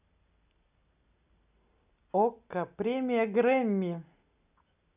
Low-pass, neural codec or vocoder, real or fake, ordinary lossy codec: 3.6 kHz; none; real; none